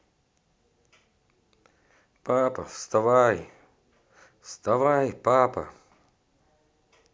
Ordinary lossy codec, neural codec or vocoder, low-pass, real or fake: none; none; none; real